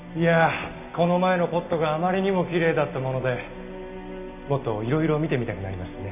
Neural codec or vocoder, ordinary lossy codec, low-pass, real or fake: none; none; 3.6 kHz; real